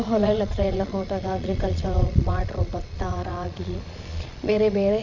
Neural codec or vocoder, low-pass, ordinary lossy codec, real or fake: vocoder, 22.05 kHz, 80 mel bands, Vocos; 7.2 kHz; none; fake